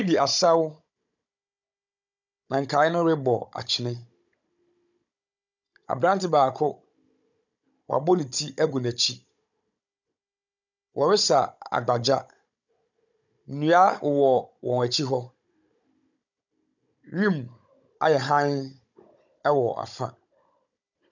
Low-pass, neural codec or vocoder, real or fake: 7.2 kHz; codec, 16 kHz, 16 kbps, FunCodec, trained on Chinese and English, 50 frames a second; fake